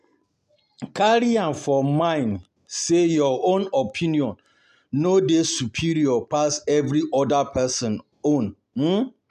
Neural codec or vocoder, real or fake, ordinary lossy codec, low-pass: none; real; MP3, 96 kbps; 14.4 kHz